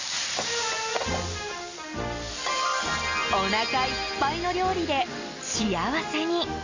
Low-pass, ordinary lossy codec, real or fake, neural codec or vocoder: 7.2 kHz; AAC, 48 kbps; real; none